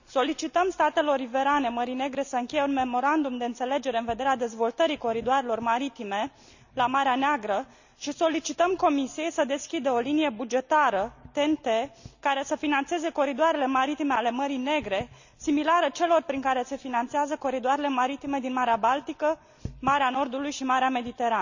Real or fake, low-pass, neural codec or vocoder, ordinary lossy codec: real; 7.2 kHz; none; none